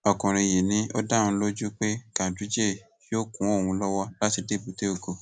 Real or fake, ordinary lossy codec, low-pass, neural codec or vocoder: real; none; none; none